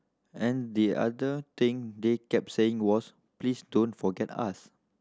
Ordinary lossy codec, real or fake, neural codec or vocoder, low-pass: none; real; none; none